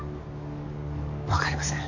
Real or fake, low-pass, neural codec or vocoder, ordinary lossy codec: fake; 7.2 kHz; codec, 44.1 kHz, 7.8 kbps, DAC; none